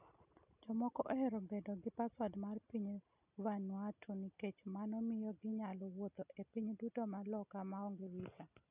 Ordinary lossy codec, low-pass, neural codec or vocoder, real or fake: none; 3.6 kHz; none; real